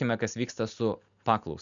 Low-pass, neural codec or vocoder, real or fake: 7.2 kHz; none; real